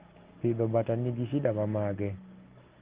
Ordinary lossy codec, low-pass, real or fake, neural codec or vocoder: Opus, 16 kbps; 3.6 kHz; real; none